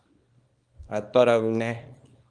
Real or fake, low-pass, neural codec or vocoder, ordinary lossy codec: fake; 9.9 kHz; codec, 24 kHz, 0.9 kbps, WavTokenizer, small release; Opus, 32 kbps